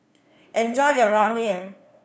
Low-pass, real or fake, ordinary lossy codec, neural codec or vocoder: none; fake; none; codec, 16 kHz, 2 kbps, FunCodec, trained on LibriTTS, 25 frames a second